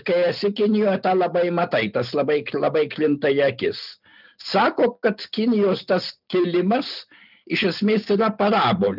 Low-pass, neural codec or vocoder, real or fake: 5.4 kHz; none; real